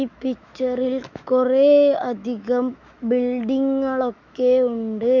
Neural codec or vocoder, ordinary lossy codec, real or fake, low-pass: autoencoder, 48 kHz, 128 numbers a frame, DAC-VAE, trained on Japanese speech; none; fake; 7.2 kHz